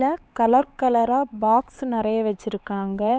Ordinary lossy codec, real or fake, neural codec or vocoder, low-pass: none; fake; codec, 16 kHz, 4 kbps, X-Codec, HuBERT features, trained on LibriSpeech; none